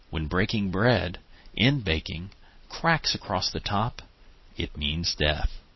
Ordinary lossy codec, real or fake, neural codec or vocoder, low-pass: MP3, 24 kbps; real; none; 7.2 kHz